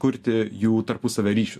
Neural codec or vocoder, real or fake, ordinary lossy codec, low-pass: none; real; MP3, 64 kbps; 14.4 kHz